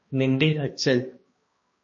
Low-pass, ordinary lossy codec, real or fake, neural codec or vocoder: 7.2 kHz; MP3, 32 kbps; fake; codec, 16 kHz, 1 kbps, X-Codec, HuBERT features, trained on balanced general audio